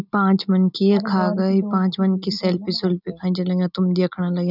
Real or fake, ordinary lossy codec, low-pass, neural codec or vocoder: real; none; 5.4 kHz; none